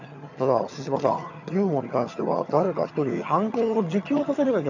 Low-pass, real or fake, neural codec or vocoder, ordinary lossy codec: 7.2 kHz; fake; vocoder, 22.05 kHz, 80 mel bands, HiFi-GAN; none